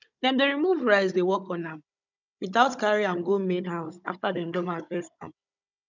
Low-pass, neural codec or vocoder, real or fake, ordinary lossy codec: 7.2 kHz; codec, 16 kHz, 16 kbps, FunCodec, trained on Chinese and English, 50 frames a second; fake; none